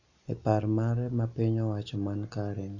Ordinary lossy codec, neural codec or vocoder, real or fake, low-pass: none; none; real; 7.2 kHz